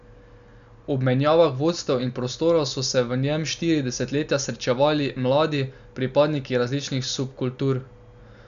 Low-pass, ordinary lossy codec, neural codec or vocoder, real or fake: 7.2 kHz; none; none; real